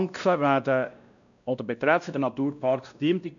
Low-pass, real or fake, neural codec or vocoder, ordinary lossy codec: 7.2 kHz; fake; codec, 16 kHz, 1 kbps, X-Codec, WavLM features, trained on Multilingual LibriSpeech; none